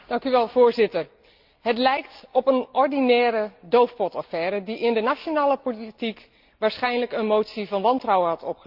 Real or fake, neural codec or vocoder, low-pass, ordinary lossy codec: real; none; 5.4 kHz; Opus, 24 kbps